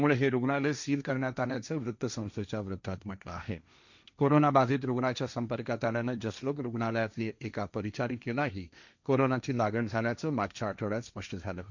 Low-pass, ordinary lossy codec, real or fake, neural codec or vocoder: 7.2 kHz; none; fake; codec, 16 kHz, 1.1 kbps, Voila-Tokenizer